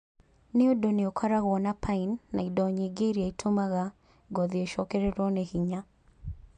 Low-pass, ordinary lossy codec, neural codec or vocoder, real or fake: 9.9 kHz; MP3, 64 kbps; none; real